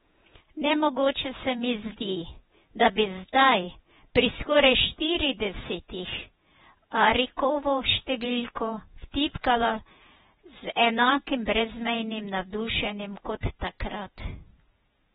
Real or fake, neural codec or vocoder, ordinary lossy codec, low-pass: fake; vocoder, 48 kHz, 128 mel bands, Vocos; AAC, 16 kbps; 19.8 kHz